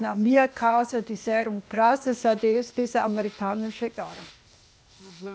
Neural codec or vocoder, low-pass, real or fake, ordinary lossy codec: codec, 16 kHz, 0.8 kbps, ZipCodec; none; fake; none